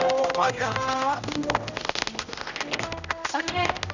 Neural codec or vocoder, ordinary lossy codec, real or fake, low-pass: codec, 16 kHz, 0.5 kbps, X-Codec, HuBERT features, trained on general audio; none; fake; 7.2 kHz